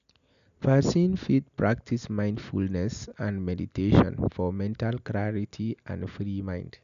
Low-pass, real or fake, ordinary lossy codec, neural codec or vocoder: 7.2 kHz; real; none; none